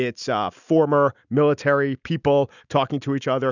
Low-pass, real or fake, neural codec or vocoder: 7.2 kHz; real; none